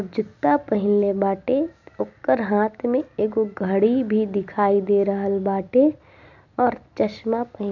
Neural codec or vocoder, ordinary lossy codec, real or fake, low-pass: none; none; real; 7.2 kHz